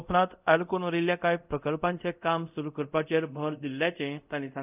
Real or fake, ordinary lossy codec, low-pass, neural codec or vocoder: fake; none; 3.6 kHz; codec, 24 kHz, 0.5 kbps, DualCodec